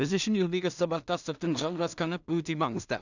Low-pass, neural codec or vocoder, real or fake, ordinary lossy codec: 7.2 kHz; codec, 16 kHz in and 24 kHz out, 0.4 kbps, LongCat-Audio-Codec, two codebook decoder; fake; none